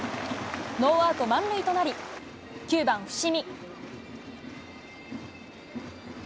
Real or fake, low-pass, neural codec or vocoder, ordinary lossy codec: real; none; none; none